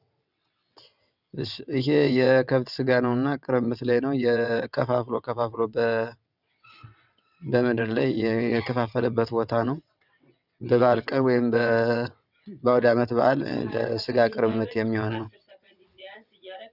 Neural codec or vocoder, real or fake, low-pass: vocoder, 22.05 kHz, 80 mel bands, WaveNeXt; fake; 5.4 kHz